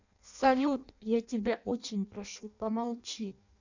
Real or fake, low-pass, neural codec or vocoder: fake; 7.2 kHz; codec, 16 kHz in and 24 kHz out, 0.6 kbps, FireRedTTS-2 codec